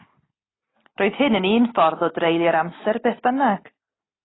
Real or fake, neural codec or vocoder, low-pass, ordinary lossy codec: real; none; 7.2 kHz; AAC, 16 kbps